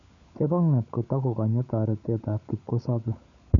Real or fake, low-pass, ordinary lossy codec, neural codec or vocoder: fake; 7.2 kHz; none; codec, 16 kHz, 16 kbps, FunCodec, trained on LibriTTS, 50 frames a second